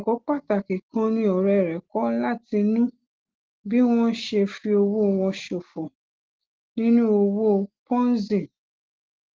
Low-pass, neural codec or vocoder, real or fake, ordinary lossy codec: 7.2 kHz; none; real; Opus, 16 kbps